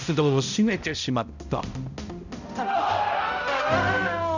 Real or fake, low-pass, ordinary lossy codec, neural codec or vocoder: fake; 7.2 kHz; none; codec, 16 kHz, 0.5 kbps, X-Codec, HuBERT features, trained on balanced general audio